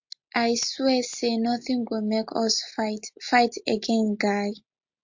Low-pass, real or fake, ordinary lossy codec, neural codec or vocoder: 7.2 kHz; real; MP3, 48 kbps; none